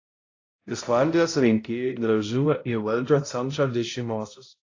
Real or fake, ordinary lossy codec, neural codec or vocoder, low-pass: fake; AAC, 32 kbps; codec, 16 kHz, 0.5 kbps, X-Codec, HuBERT features, trained on balanced general audio; 7.2 kHz